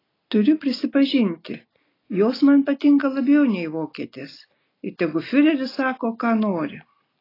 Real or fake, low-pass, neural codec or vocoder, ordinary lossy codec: real; 5.4 kHz; none; AAC, 24 kbps